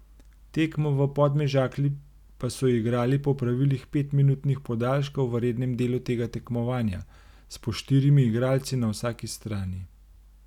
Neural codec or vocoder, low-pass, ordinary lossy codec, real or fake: none; 19.8 kHz; none; real